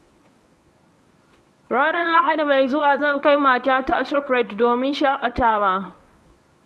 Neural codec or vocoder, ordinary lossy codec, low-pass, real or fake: codec, 24 kHz, 0.9 kbps, WavTokenizer, medium speech release version 1; none; none; fake